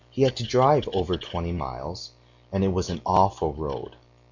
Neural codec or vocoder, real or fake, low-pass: none; real; 7.2 kHz